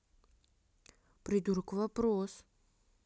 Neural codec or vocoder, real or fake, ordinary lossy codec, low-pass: none; real; none; none